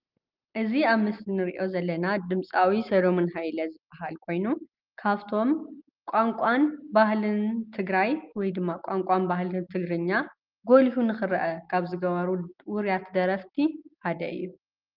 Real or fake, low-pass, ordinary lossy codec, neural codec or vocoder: real; 5.4 kHz; Opus, 24 kbps; none